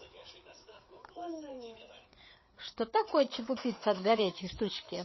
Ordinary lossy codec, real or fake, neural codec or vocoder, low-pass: MP3, 24 kbps; fake; codec, 16 kHz, 4 kbps, FreqCodec, larger model; 7.2 kHz